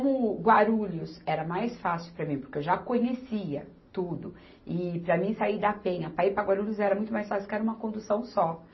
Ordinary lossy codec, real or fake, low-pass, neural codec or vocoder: MP3, 24 kbps; real; 7.2 kHz; none